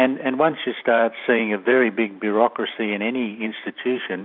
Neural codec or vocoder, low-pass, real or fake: vocoder, 44.1 kHz, 128 mel bands every 512 samples, BigVGAN v2; 5.4 kHz; fake